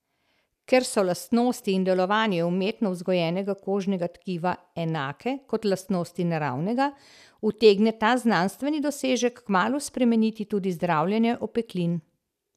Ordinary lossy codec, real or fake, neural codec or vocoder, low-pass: none; real; none; 14.4 kHz